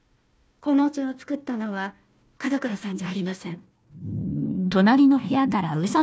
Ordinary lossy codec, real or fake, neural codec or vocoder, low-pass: none; fake; codec, 16 kHz, 1 kbps, FunCodec, trained on Chinese and English, 50 frames a second; none